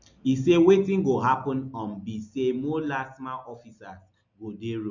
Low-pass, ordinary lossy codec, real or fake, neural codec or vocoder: 7.2 kHz; none; real; none